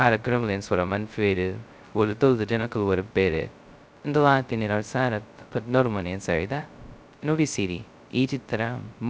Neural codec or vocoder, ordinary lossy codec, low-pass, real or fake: codec, 16 kHz, 0.2 kbps, FocalCodec; none; none; fake